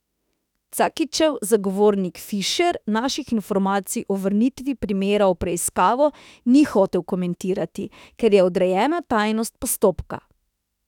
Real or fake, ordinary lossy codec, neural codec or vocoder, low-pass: fake; none; autoencoder, 48 kHz, 32 numbers a frame, DAC-VAE, trained on Japanese speech; 19.8 kHz